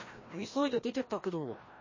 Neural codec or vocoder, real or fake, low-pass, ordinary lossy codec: codec, 16 kHz, 1 kbps, FreqCodec, larger model; fake; 7.2 kHz; MP3, 32 kbps